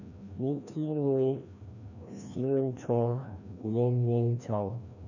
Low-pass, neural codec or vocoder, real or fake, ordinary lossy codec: 7.2 kHz; codec, 16 kHz, 1 kbps, FreqCodec, larger model; fake; none